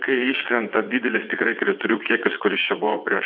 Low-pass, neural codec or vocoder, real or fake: 5.4 kHz; vocoder, 22.05 kHz, 80 mel bands, WaveNeXt; fake